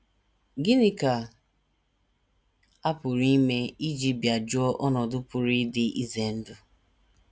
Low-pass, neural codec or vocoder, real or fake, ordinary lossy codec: none; none; real; none